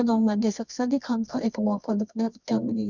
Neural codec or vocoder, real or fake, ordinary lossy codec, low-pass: codec, 24 kHz, 0.9 kbps, WavTokenizer, medium music audio release; fake; none; 7.2 kHz